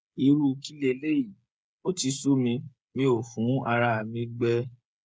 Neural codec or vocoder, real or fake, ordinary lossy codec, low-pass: codec, 16 kHz, 8 kbps, FreqCodec, smaller model; fake; none; none